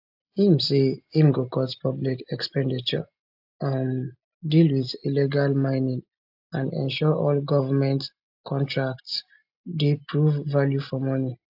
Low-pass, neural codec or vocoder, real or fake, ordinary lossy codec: 5.4 kHz; none; real; AAC, 48 kbps